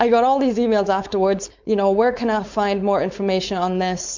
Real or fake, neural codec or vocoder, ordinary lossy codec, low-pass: fake; codec, 16 kHz, 4.8 kbps, FACodec; MP3, 64 kbps; 7.2 kHz